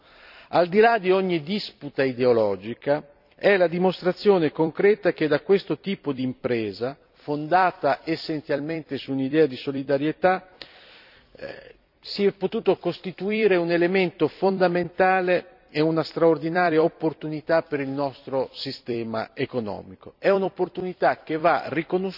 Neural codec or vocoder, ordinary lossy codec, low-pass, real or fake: vocoder, 44.1 kHz, 128 mel bands every 256 samples, BigVGAN v2; none; 5.4 kHz; fake